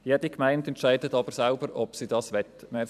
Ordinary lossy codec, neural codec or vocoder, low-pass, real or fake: none; vocoder, 44.1 kHz, 128 mel bands every 256 samples, BigVGAN v2; 14.4 kHz; fake